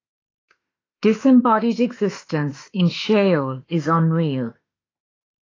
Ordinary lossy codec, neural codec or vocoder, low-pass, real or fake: AAC, 32 kbps; autoencoder, 48 kHz, 32 numbers a frame, DAC-VAE, trained on Japanese speech; 7.2 kHz; fake